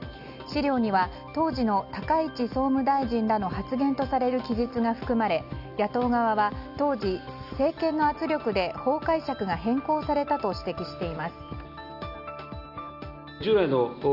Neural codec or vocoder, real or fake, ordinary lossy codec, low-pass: none; real; none; 5.4 kHz